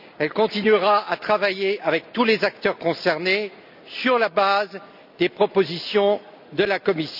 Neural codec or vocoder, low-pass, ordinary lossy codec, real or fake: none; 5.4 kHz; none; real